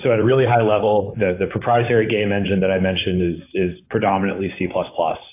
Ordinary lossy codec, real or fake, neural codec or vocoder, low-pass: AAC, 24 kbps; fake; vocoder, 44.1 kHz, 128 mel bands, Pupu-Vocoder; 3.6 kHz